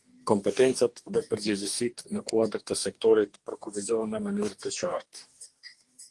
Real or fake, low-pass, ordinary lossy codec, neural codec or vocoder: fake; 10.8 kHz; Opus, 24 kbps; codec, 44.1 kHz, 2.6 kbps, DAC